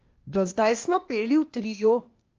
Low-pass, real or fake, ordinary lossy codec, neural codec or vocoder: 7.2 kHz; fake; Opus, 24 kbps; codec, 16 kHz, 0.8 kbps, ZipCodec